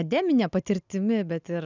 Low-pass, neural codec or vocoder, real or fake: 7.2 kHz; none; real